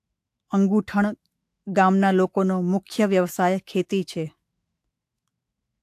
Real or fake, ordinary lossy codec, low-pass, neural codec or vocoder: fake; AAC, 64 kbps; 14.4 kHz; autoencoder, 48 kHz, 128 numbers a frame, DAC-VAE, trained on Japanese speech